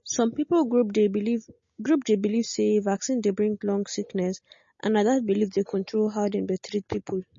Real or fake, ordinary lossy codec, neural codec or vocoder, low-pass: real; MP3, 32 kbps; none; 7.2 kHz